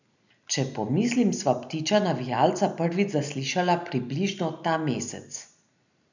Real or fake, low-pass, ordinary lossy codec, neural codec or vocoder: real; 7.2 kHz; none; none